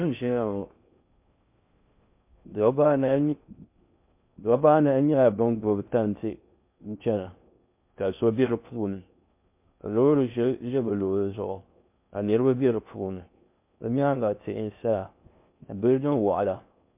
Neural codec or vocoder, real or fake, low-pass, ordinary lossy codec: codec, 16 kHz in and 24 kHz out, 0.6 kbps, FocalCodec, streaming, 2048 codes; fake; 3.6 kHz; MP3, 32 kbps